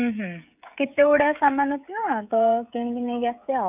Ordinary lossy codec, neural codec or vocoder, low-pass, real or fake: none; codec, 16 kHz, 16 kbps, FreqCodec, smaller model; 3.6 kHz; fake